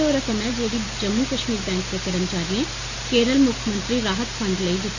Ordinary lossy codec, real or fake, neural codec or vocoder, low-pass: none; real; none; 7.2 kHz